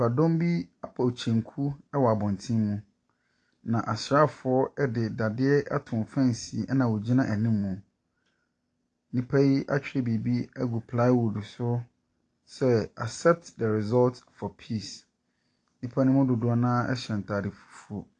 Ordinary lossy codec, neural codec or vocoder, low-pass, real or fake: AAC, 48 kbps; none; 9.9 kHz; real